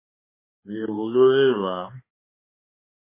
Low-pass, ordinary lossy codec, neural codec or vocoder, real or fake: 3.6 kHz; MP3, 16 kbps; codec, 16 kHz, 2 kbps, X-Codec, HuBERT features, trained on balanced general audio; fake